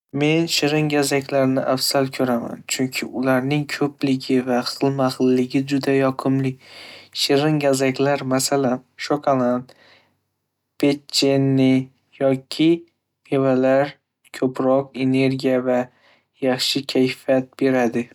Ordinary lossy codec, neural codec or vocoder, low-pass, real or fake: none; none; 19.8 kHz; real